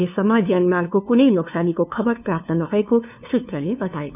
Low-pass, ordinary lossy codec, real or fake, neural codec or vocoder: 3.6 kHz; none; fake; codec, 16 kHz, 2 kbps, FunCodec, trained on LibriTTS, 25 frames a second